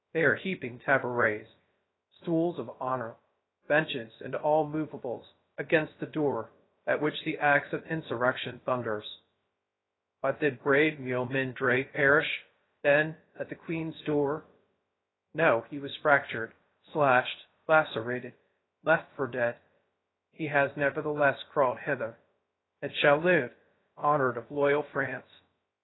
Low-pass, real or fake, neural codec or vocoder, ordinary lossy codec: 7.2 kHz; fake; codec, 16 kHz, 0.3 kbps, FocalCodec; AAC, 16 kbps